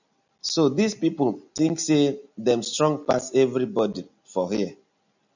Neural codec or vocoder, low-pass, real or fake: none; 7.2 kHz; real